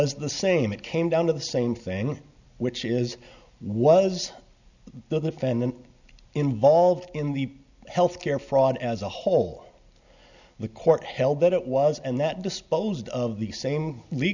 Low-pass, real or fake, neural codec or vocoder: 7.2 kHz; real; none